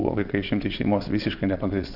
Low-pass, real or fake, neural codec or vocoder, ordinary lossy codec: 5.4 kHz; fake; vocoder, 22.05 kHz, 80 mel bands, WaveNeXt; Opus, 64 kbps